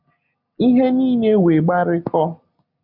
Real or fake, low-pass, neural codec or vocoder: real; 5.4 kHz; none